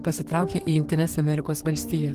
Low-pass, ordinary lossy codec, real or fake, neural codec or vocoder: 14.4 kHz; Opus, 16 kbps; fake; codec, 32 kHz, 1.9 kbps, SNAC